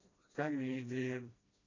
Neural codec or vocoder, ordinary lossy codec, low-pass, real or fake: codec, 16 kHz, 1 kbps, FreqCodec, smaller model; AAC, 32 kbps; 7.2 kHz; fake